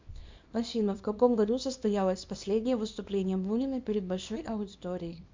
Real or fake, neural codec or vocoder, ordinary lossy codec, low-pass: fake; codec, 24 kHz, 0.9 kbps, WavTokenizer, small release; MP3, 64 kbps; 7.2 kHz